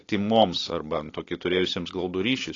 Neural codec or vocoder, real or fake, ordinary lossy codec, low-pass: none; real; AAC, 32 kbps; 7.2 kHz